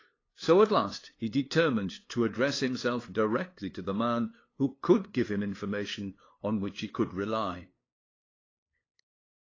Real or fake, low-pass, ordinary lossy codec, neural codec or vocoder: fake; 7.2 kHz; AAC, 32 kbps; codec, 16 kHz, 2 kbps, FunCodec, trained on LibriTTS, 25 frames a second